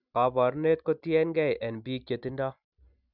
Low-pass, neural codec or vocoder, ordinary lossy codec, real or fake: 5.4 kHz; none; none; real